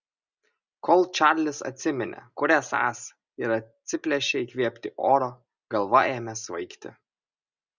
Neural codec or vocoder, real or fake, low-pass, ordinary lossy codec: none; real; 7.2 kHz; Opus, 64 kbps